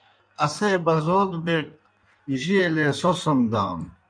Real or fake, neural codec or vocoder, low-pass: fake; codec, 16 kHz in and 24 kHz out, 1.1 kbps, FireRedTTS-2 codec; 9.9 kHz